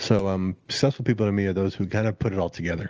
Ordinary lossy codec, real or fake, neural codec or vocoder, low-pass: Opus, 24 kbps; real; none; 7.2 kHz